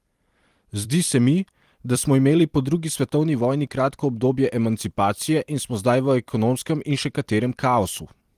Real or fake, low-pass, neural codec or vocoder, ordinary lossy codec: fake; 14.4 kHz; vocoder, 44.1 kHz, 128 mel bands every 256 samples, BigVGAN v2; Opus, 24 kbps